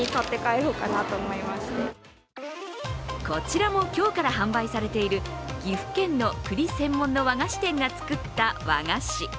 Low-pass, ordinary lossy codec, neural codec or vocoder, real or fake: none; none; none; real